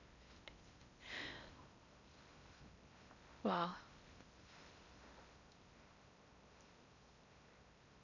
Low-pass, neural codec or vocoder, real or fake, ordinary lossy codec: 7.2 kHz; codec, 16 kHz in and 24 kHz out, 0.8 kbps, FocalCodec, streaming, 65536 codes; fake; none